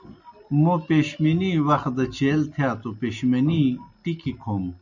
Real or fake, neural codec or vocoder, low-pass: real; none; 7.2 kHz